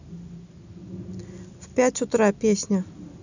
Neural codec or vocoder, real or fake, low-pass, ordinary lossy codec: none; real; 7.2 kHz; none